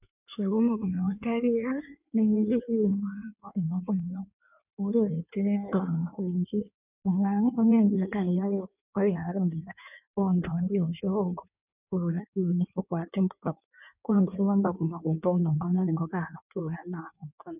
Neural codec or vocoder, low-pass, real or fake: codec, 16 kHz in and 24 kHz out, 1.1 kbps, FireRedTTS-2 codec; 3.6 kHz; fake